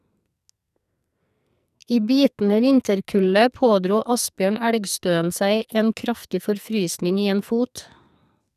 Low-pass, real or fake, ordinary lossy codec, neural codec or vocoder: 14.4 kHz; fake; none; codec, 44.1 kHz, 2.6 kbps, SNAC